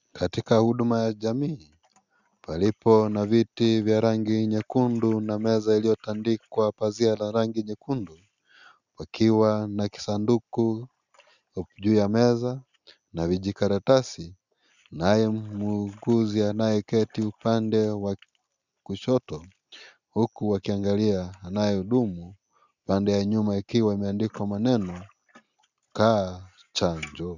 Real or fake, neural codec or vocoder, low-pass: real; none; 7.2 kHz